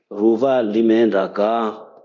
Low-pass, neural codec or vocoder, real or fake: 7.2 kHz; codec, 24 kHz, 0.9 kbps, DualCodec; fake